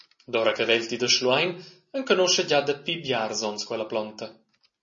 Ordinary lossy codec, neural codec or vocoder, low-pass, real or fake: MP3, 32 kbps; none; 7.2 kHz; real